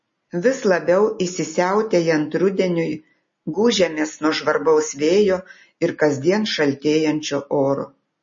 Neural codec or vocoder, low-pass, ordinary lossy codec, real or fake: none; 7.2 kHz; MP3, 32 kbps; real